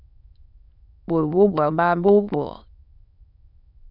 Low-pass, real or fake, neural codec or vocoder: 5.4 kHz; fake; autoencoder, 22.05 kHz, a latent of 192 numbers a frame, VITS, trained on many speakers